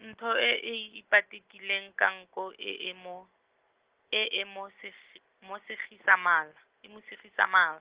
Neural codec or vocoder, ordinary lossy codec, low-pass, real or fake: none; Opus, 16 kbps; 3.6 kHz; real